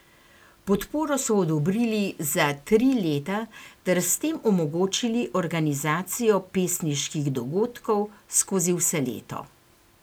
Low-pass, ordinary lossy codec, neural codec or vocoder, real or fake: none; none; none; real